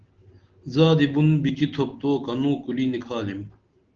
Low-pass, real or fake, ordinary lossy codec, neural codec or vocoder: 7.2 kHz; real; Opus, 16 kbps; none